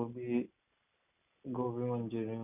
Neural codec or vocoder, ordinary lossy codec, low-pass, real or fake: none; none; 3.6 kHz; real